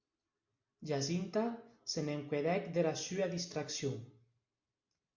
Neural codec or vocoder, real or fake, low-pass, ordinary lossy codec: none; real; 7.2 kHz; MP3, 64 kbps